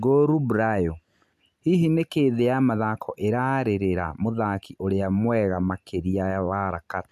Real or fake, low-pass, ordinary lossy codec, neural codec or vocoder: real; 14.4 kHz; none; none